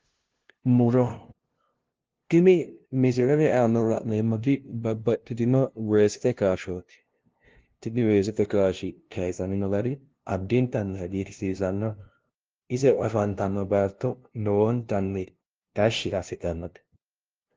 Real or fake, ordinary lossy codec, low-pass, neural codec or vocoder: fake; Opus, 16 kbps; 7.2 kHz; codec, 16 kHz, 0.5 kbps, FunCodec, trained on LibriTTS, 25 frames a second